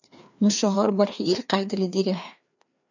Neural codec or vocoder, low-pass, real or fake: codec, 16 kHz, 2 kbps, FreqCodec, larger model; 7.2 kHz; fake